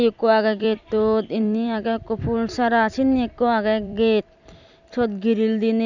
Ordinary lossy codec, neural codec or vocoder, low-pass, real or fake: Opus, 64 kbps; vocoder, 44.1 kHz, 128 mel bands every 256 samples, BigVGAN v2; 7.2 kHz; fake